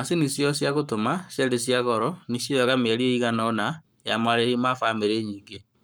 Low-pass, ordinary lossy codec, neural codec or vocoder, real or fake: none; none; codec, 44.1 kHz, 7.8 kbps, Pupu-Codec; fake